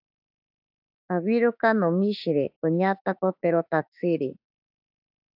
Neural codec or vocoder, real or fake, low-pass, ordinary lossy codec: autoencoder, 48 kHz, 32 numbers a frame, DAC-VAE, trained on Japanese speech; fake; 5.4 kHz; AAC, 48 kbps